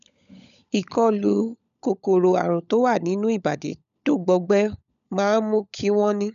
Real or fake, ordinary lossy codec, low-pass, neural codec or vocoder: fake; none; 7.2 kHz; codec, 16 kHz, 16 kbps, FunCodec, trained on LibriTTS, 50 frames a second